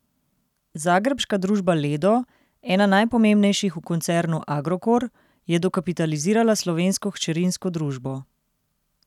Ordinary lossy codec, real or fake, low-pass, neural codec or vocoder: none; real; 19.8 kHz; none